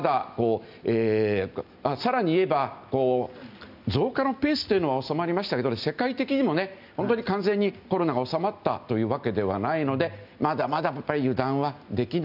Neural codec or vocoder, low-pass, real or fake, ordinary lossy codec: none; 5.4 kHz; real; none